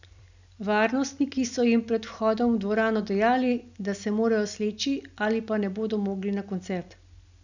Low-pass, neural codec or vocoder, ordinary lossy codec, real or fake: 7.2 kHz; none; none; real